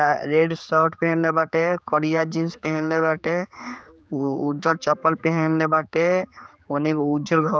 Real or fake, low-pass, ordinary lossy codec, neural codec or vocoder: fake; none; none; codec, 16 kHz, 4 kbps, X-Codec, HuBERT features, trained on general audio